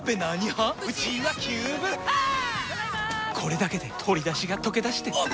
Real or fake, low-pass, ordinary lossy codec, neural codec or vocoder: real; none; none; none